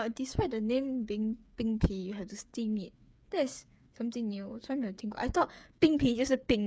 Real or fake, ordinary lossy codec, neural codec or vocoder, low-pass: fake; none; codec, 16 kHz, 8 kbps, FreqCodec, smaller model; none